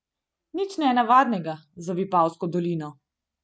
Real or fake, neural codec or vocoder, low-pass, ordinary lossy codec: real; none; none; none